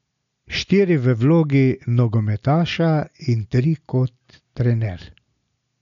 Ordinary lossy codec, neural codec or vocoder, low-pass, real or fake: none; none; 7.2 kHz; real